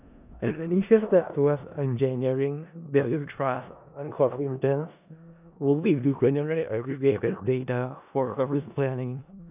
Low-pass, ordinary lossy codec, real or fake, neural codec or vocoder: 3.6 kHz; none; fake; codec, 16 kHz in and 24 kHz out, 0.4 kbps, LongCat-Audio-Codec, four codebook decoder